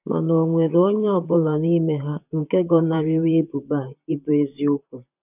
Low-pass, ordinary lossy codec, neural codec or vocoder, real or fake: 3.6 kHz; none; vocoder, 44.1 kHz, 128 mel bands, Pupu-Vocoder; fake